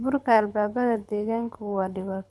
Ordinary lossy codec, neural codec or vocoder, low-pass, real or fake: none; codec, 24 kHz, 6 kbps, HILCodec; none; fake